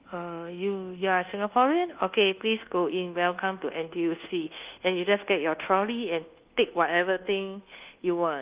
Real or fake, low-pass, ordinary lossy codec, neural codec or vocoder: fake; 3.6 kHz; Opus, 32 kbps; codec, 24 kHz, 1.2 kbps, DualCodec